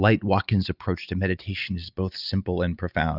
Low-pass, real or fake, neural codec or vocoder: 5.4 kHz; real; none